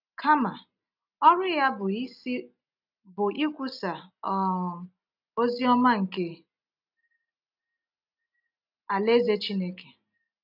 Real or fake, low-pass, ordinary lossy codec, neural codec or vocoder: real; 5.4 kHz; none; none